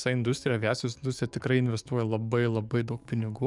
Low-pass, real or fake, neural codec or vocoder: 10.8 kHz; fake; codec, 44.1 kHz, 7.8 kbps, DAC